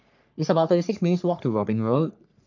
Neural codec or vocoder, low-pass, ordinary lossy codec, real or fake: codec, 44.1 kHz, 3.4 kbps, Pupu-Codec; 7.2 kHz; none; fake